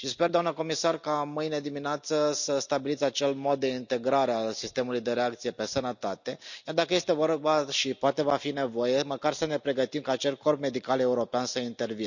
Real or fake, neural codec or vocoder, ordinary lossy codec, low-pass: real; none; none; 7.2 kHz